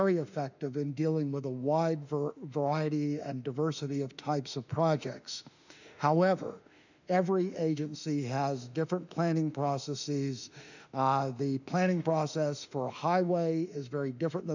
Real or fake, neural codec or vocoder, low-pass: fake; autoencoder, 48 kHz, 32 numbers a frame, DAC-VAE, trained on Japanese speech; 7.2 kHz